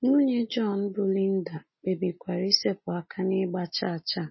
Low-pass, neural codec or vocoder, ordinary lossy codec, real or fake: 7.2 kHz; none; MP3, 24 kbps; real